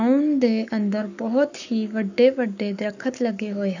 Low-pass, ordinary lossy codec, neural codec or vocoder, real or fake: 7.2 kHz; none; codec, 44.1 kHz, 7.8 kbps, Pupu-Codec; fake